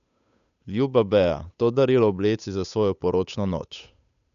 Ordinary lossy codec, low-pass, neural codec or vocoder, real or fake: none; 7.2 kHz; codec, 16 kHz, 8 kbps, FunCodec, trained on Chinese and English, 25 frames a second; fake